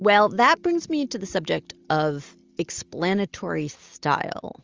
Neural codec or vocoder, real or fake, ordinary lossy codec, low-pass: none; real; Opus, 32 kbps; 7.2 kHz